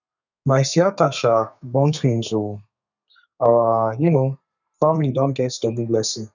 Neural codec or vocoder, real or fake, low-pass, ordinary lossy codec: codec, 32 kHz, 1.9 kbps, SNAC; fake; 7.2 kHz; none